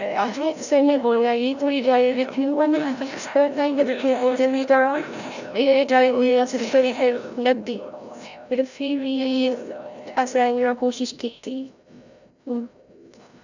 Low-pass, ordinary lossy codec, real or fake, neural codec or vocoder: 7.2 kHz; none; fake; codec, 16 kHz, 0.5 kbps, FreqCodec, larger model